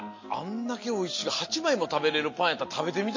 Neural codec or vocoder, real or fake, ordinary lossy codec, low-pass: none; real; MP3, 32 kbps; 7.2 kHz